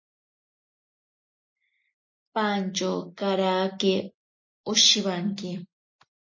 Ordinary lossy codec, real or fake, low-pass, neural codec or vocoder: MP3, 32 kbps; real; 7.2 kHz; none